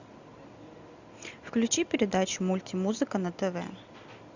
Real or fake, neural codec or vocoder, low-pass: real; none; 7.2 kHz